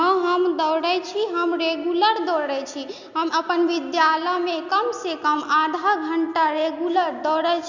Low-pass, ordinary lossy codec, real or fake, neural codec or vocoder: 7.2 kHz; none; real; none